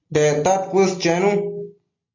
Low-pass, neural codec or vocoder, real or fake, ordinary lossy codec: 7.2 kHz; none; real; AAC, 48 kbps